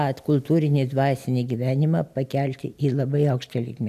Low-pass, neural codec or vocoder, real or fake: 14.4 kHz; none; real